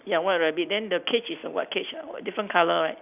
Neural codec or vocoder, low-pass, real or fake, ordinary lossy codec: none; 3.6 kHz; real; none